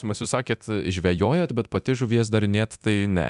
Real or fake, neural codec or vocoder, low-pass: fake; codec, 24 kHz, 0.9 kbps, DualCodec; 10.8 kHz